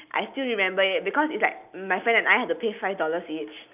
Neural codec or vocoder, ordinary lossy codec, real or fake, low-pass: none; none; real; 3.6 kHz